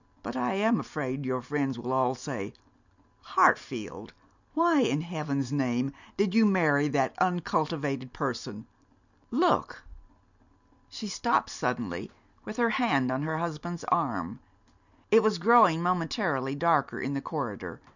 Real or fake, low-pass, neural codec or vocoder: real; 7.2 kHz; none